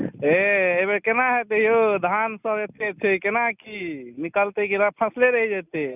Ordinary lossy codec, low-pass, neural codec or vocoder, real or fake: none; 3.6 kHz; none; real